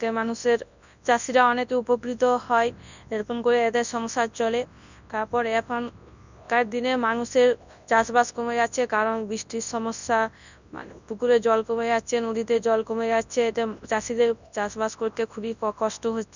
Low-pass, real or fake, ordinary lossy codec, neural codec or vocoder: 7.2 kHz; fake; none; codec, 24 kHz, 0.9 kbps, WavTokenizer, large speech release